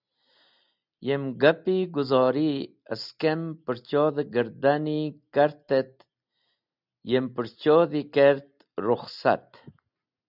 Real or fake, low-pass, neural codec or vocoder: real; 5.4 kHz; none